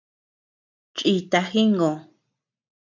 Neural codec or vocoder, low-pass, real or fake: none; 7.2 kHz; real